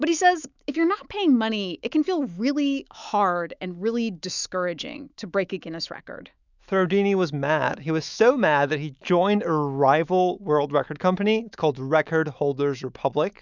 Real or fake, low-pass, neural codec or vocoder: fake; 7.2 kHz; autoencoder, 48 kHz, 128 numbers a frame, DAC-VAE, trained on Japanese speech